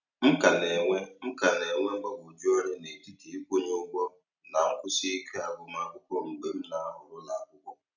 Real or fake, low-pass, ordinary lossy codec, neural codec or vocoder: real; 7.2 kHz; none; none